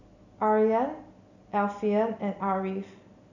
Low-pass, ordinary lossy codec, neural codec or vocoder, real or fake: 7.2 kHz; none; none; real